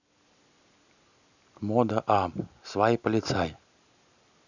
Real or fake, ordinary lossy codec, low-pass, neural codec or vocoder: real; none; 7.2 kHz; none